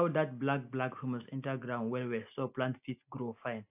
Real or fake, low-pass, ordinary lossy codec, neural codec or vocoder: real; 3.6 kHz; AAC, 32 kbps; none